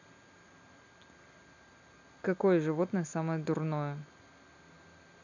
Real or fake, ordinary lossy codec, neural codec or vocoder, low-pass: real; none; none; 7.2 kHz